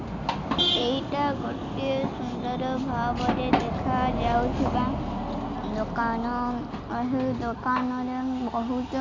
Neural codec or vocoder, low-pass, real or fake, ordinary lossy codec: none; 7.2 kHz; real; MP3, 48 kbps